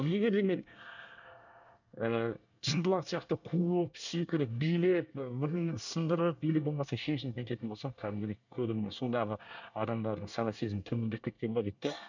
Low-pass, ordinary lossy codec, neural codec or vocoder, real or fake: 7.2 kHz; none; codec, 24 kHz, 1 kbps, SNAC; fake